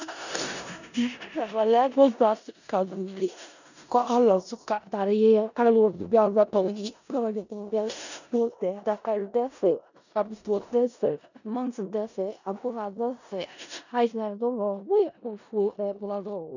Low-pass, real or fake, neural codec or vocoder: 7.2 kHz; fake; codec, 16 kHz in and 24 kHz out, 0.4 kbps, LongCat-Audio-Codec, four codebook decoder